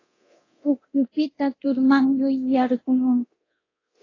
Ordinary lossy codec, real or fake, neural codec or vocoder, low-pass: AAC, 32 kbps; fake; codec, 24 kHz, 0.9 kbps, DualCodec; 7.2 kHz